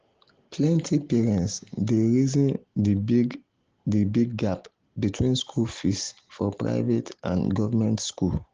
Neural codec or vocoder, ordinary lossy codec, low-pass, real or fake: none; Opus, 16 kbps; 7.2 kHz; real